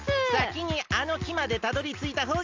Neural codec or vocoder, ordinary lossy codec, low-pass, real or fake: none; Opus, 32 kbps; 7.2 kHz; real